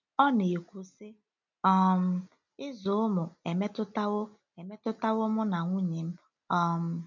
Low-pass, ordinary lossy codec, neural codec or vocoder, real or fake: 7.2 kHz; none; none; real